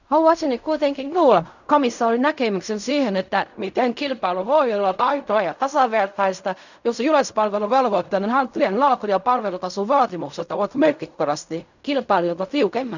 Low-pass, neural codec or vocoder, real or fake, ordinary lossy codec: 7.2 kHz; codec, 16 kHz in and 24 kHz out, 0.4 kbps, LongCat-Audio-Codec, fine tuned four codebook decoder; fake; none